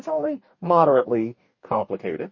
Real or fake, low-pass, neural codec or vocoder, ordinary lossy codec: fake; 7.2 kHz; codec, 44.1 kHz, 2.6 kbps, DAC; MP3, 32 kbps